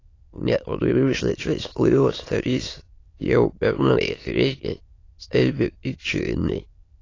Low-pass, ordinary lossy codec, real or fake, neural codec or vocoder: 7.2 kHz; AAC, 32 kbps; fake; autoencoder, 22.05 kHz, a latent of 192 numbers a frame, VITS, trained on many speakers